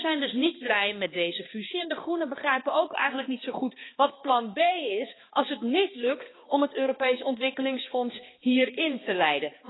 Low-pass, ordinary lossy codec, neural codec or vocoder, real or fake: 7.2 kHz; AAC, 16 kbps; codec, 16 kHz, 2 kbps, X-Codec, HuBERT features, trained on balanced general audio; fake